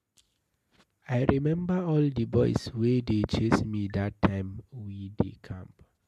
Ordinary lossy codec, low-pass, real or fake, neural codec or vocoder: MP3, 64 kbps; 14.4 kHz; real; none